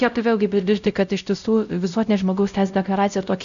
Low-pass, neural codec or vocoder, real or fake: 7.2 kHz; codec, 16 kHz, 0.5 kbps, X-Codec, WavLM features, trained on Multilingual LibriSpeech; fake